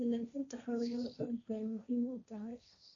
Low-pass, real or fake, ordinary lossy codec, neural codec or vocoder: 7.2 kHz; fake; MP3, 96 kbps; codec, 16 kHz, 1.1 kbps, Voila-Tokenizer